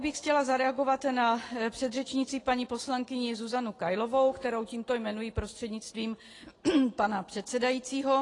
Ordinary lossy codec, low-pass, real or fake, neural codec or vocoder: AAC, 32 kbps; 10.8 kHz; real; none